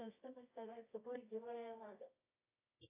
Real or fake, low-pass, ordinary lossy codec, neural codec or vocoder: fake; 3.6 kHz; AAC, 24 kbps; codec, 24 kHz, 0.9 kbps, WavTokenizer, medium music audio release